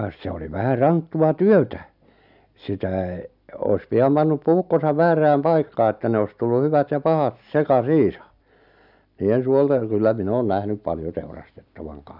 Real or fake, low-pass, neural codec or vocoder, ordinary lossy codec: real; 5.4 kHz; none; none